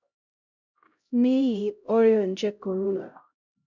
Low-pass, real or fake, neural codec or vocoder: 7.2 kHz; fake; codec, 16 kHz, 0.5 kbps, X-Codec, HuBERT features, trained on LibriSpeech